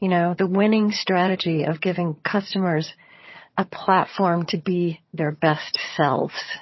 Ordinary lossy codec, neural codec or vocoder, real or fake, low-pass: MP3, 24 kbps; vocoder, 22.05 kHz, 80 mel bands, HiFi-GAN; fake; 7.2 kHz